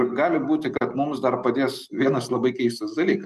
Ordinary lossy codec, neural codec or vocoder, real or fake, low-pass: Opus, 32 kbps; none; real; 14.4 kHz